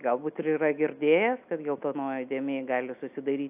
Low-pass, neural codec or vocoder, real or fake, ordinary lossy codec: 3.6 kHz; none; real; AAC, 32 kbps